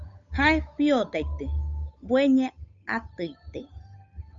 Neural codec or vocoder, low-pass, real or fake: codec, 16 kHz, 8 kbps, FunCodec, trained on Chinese and English, 25 frames a second; 7.2 kHz; fake